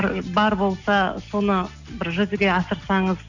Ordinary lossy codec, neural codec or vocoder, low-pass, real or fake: none; none; 7.2 kHz; real